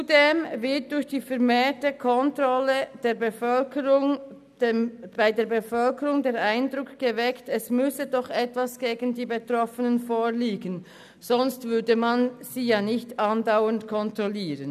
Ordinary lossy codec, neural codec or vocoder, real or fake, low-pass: none; none; real; 14.4 kHz